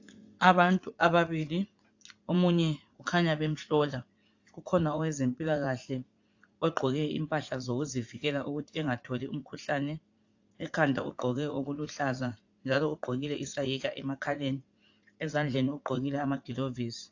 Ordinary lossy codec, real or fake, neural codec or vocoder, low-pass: AAC, 48 kbps; fake; vocoder, 44.1 kHz, 80 mel bands, Vocos; 7.2 kHz